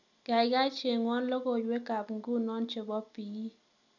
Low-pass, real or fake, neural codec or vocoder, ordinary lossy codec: 7.2 kHz; real; none; none